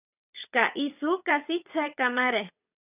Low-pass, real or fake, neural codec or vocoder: 3.6 kHz; fake; vocoder, 22.05 kHz, 80 mel bands, WaveNeXt